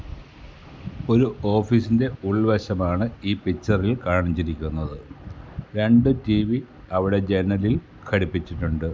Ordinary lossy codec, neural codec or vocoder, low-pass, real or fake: none; none; none; real